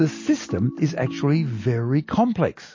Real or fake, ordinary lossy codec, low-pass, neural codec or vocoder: real; MP3, 32 kbps; 7.2 kHz; none